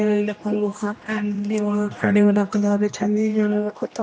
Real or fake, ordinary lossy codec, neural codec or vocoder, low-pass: fake; none; codec, 16 kHz, 1 kbps, X-Codec, HuBERT features, trained on general audio; none